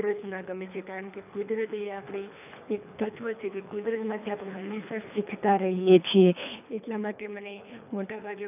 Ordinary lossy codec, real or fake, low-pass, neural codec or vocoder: none; fake; 3.6 kHz; codec, 24 kHz, 3 kbps, HILCodec